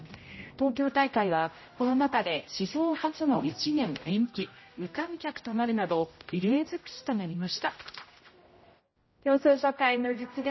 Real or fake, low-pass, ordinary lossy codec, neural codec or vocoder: fake; 7.2 kHz; MP3, 24 kbps; codec, 16 kHz, 0.5 kbps, X-Codec, HuBERT features, trained on general audio